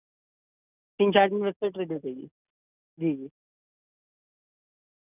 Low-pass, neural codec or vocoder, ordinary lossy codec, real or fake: 3.6 kHz; none; none; real